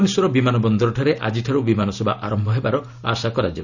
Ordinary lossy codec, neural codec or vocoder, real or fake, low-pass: none; none; real; 7.2 kHz